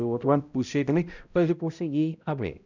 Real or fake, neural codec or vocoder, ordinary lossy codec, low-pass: fake; codec, 16 kHz, 0.5 kbps, X-Codec, HuBERT features, trained on balanced general audio; none; 7.2 kHz